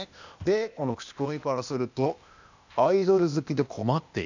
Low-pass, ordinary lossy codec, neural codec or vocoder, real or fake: 7.2 kHz; none; codec, 16 kHz, 0.8 kbps, ZipCodec; fake